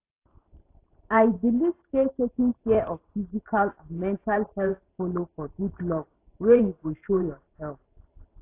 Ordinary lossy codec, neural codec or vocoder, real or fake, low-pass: AAC, 24 kbps; vocoder, 44.1 kHz, 128 mel bands every 512 samples, BigVGAN v2; fake; 3.6 kHz